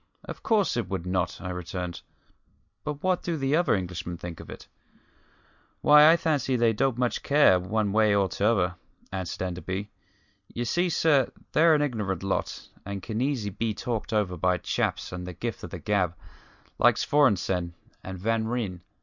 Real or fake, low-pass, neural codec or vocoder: real; 7.2 kHz; none